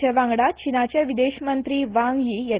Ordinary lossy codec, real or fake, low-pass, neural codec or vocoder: Opus, 16 kbps; real; 3.6 kHz; none